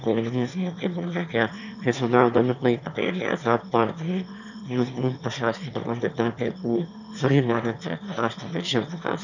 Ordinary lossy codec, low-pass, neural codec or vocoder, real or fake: none; 7.2 kHz; autoencoder, 22.05 kHz, a latent of 192 numbers a frame, VITS, trained on one speaker; fake